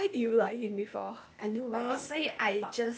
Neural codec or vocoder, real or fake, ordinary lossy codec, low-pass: codec, 16 kHz, 0.8 kbps, ZipCodec; fake; none; none